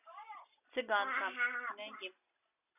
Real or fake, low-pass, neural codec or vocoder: real; 3.6 kHz; none